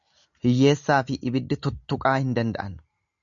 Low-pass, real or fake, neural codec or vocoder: 7.2 kHz; real; none